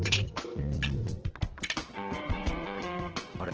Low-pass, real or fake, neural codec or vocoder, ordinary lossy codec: 7.2 kHz; fake; vocoder, 22.05 kHz, 80 mel bands, WaveNeXt; Opus, 16 kbps